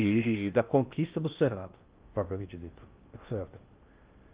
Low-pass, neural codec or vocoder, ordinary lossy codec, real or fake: 3.6 kHz; codec, 16 kHz in and 24 kHz out, 0.6 kbps, FocalCodec, streaming, 4096 codes; Opus, 32 kbps; fake